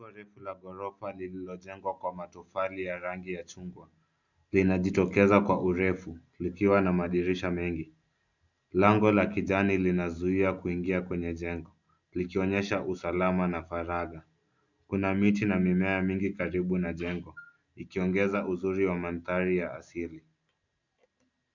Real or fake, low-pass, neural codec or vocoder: real; 7.2 kHz; none